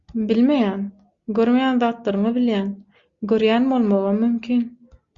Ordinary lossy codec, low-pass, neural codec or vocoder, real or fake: Opus, 64 kbps; 7.2 kHz; none; real